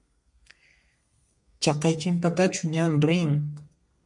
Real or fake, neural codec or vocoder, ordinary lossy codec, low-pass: fake; codec, 32 kHz, 1.9 kbps, SNAC; MP3, 64 kbps; 10.8 kHz